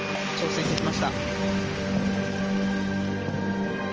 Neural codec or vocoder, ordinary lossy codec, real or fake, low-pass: none; Opus, 24 kbps; real; 7.2 kHz